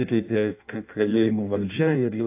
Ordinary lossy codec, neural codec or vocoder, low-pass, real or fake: AAC, 32 kbps; codec, 16 kHz in and 24 kHz out, 0.6 kbps, FireRedTTS-2 codec; 3.6 kHz; fake